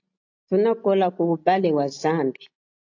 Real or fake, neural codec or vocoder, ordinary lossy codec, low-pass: real; none; AAC, 48 kbps; 7.2 kHz